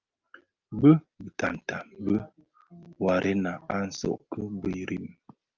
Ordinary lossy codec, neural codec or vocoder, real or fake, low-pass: Opus, 24 kbps; none; real; 7.2 kHz